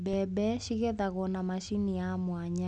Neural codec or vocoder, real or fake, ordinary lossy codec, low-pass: none; real; none; 10.8 kHz